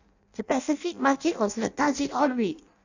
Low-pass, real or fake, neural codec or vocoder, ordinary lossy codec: 7.2 kHz; fake; codec, 16 kHz in and 24 kHz out, 0.6 kbps, FireRedTTS-2 codec; none